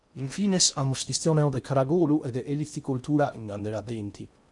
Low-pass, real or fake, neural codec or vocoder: 10.8 kHz; fake; codec, 16 kHz in and 24 kHz out, 0.6 kbps, FocalCodec, streaming, 4096 codes